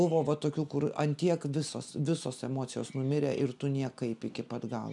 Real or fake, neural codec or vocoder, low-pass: real; none; 10.8 kHz